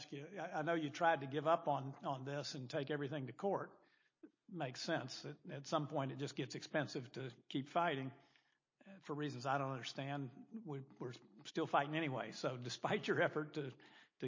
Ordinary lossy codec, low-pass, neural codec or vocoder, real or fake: MP3, 32 kbps; 7.2 kHz; none; real